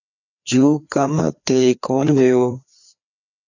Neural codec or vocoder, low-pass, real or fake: codec, 16 kHz, 2 kbps, FreqCodec, larger model; 7.2 kHz; fake